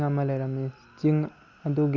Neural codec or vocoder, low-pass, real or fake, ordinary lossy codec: none; 7.2 kHz; real; Opus, 64 kbps